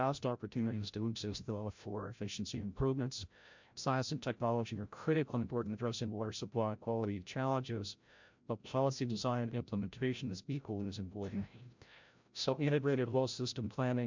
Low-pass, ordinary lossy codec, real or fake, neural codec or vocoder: 7.2 kHz; MP3, 64 kbps; fake; codec, 16 kHz, 0.5 kbps, FreqCodec, larger model